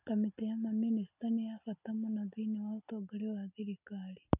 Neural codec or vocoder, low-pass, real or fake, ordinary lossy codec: none; 3.6 kHz; real; none